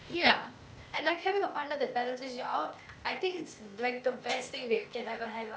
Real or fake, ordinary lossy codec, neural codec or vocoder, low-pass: fake; none; codec, 16 kHz, 0.8 kbps, ZipCodec; none